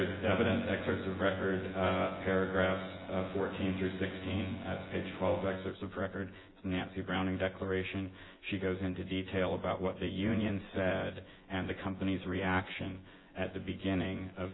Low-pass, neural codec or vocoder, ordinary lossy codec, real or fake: 7.2 kHz; vocoder, 24 kHz, 100 mel bands, Vocos; AAC, 16 kbps; fake